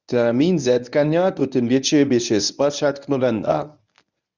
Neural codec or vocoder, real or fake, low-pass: codec, 24 kHz, 0.9 kbps, WavTokenizer, medium speech release version 1; fake; 7.2 kHz